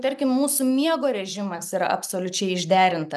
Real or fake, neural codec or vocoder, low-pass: real; none; 14.4 kHz